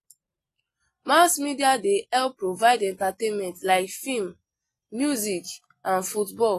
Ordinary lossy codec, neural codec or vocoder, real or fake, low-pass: AAC, 48 kbps; none; real; 14.4 kHz